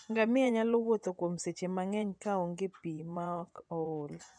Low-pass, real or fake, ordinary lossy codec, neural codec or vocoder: 9.9 kHz; fake; none; vocoder, 24 kHz, 100 mel bands, Vocos